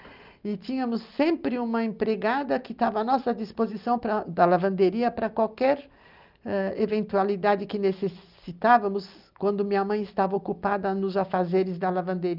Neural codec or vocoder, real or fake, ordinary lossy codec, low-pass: none; real; Opus, 32 kbps; 5.4 kHz